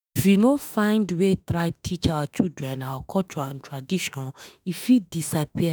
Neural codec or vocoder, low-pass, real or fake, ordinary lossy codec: autoencoder, 48 kHz, 32 numbers a frame, DAC-VAE, trained on Japanese speech; none; fake; none